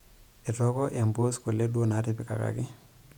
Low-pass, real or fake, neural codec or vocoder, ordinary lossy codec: 19.8 kHz; fake; vocoder, 48 kHz, 128 mel bands, Vocos; none